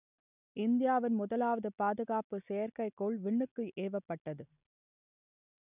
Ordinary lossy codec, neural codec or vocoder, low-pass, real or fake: none; none; 3.6 kHz; real